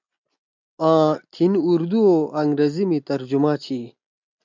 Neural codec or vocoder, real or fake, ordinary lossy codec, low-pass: none; real; MP3, 64 kbps; 7.2 kHz